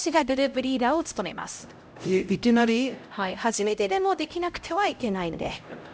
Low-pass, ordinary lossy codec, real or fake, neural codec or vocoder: none; none; fake; codec, 16 kHz, 0.5 kbps, X-Codec, HuBERT features, trained on LibriSpeech